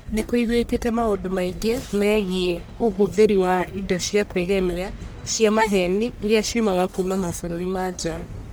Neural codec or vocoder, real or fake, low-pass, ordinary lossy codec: codec, 44.1 kHz, 1.7 kbps, Pupu-Codec; fake; none; none